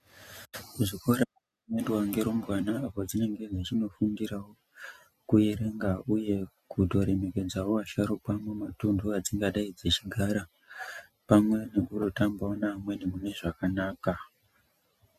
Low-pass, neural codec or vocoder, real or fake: 14.4 kHz; none; real